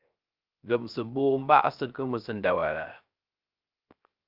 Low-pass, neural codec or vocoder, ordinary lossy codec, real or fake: 5.4 kHz; codec, 16 kHz, 0.3 kbps, FocalCodec; Opus, 24 kbps; fake